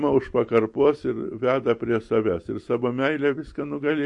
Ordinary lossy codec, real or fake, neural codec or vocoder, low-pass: MP3, 48 kbps; real; none; 10.8 kHz